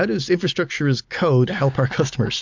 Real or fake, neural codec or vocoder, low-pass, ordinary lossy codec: real; none; 7.2 kHz; MP3, 64 kbps